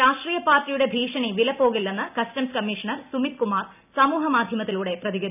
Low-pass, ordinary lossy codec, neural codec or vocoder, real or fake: 3.6 kHz; none; none; real